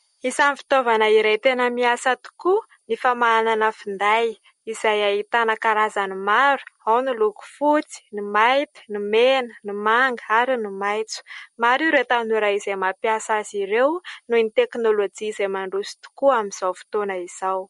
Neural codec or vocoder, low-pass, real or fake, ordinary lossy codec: none; 19.8 kHz; real; MP3, 48 kbps